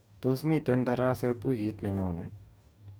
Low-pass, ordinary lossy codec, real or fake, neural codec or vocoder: none; none; fake; codec, 44.1 kHz, 2.6 kbps, DAC